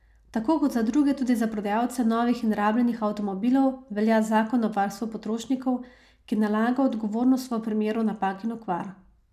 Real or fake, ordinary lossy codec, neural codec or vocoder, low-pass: real; none; none; 14.4 kHz